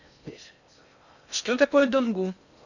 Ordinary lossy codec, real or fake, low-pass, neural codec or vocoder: none; fake; 7.2 kHz; codec, 16 kHz in and 24 kHz out, 0.6 kbps, FocalCodec, streaming, 4096 codes